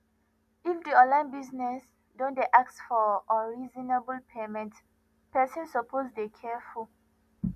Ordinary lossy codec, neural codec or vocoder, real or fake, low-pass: none; none; real; 14.4 kHz